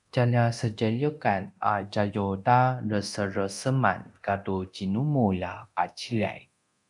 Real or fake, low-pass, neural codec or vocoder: fake; 10.8 kHz; codec, 24 kHz, 0.9 kbps, DualCodec